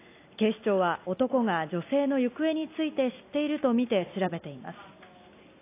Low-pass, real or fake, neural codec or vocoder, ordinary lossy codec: 3.6 kHz; real; none; AAC, 24 kbps